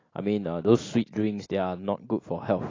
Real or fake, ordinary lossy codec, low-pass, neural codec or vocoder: real; AAC, 32 kbps; 7.2 kHz; none